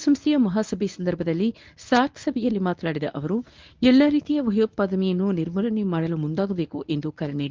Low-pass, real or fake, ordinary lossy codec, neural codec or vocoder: 7.2 kHz; fake; Opus, 32 kbps; codec, 24 kHz, 0.9 kbps, WavTokenizer, medium speech release version 1